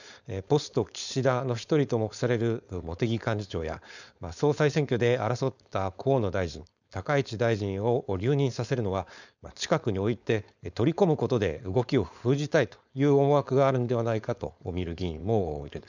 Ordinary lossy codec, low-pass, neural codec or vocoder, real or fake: none; 7.2 kHz; codec, 16 kHz, 4.8 kbps, FACodec; fake